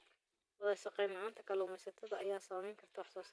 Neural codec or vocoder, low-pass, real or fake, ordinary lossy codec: vocoder, 22.05 kHz, 80 mel bands, Vocos; none; fake; none